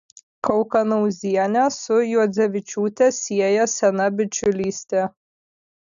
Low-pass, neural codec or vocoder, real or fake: 7.2 kHz; none; real